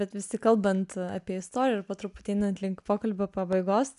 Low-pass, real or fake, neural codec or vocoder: 10.8 kHz; real; none